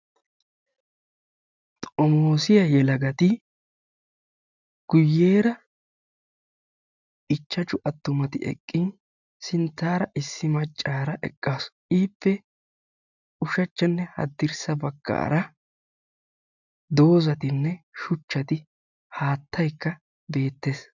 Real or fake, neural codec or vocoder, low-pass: real; none; 7.2 kHz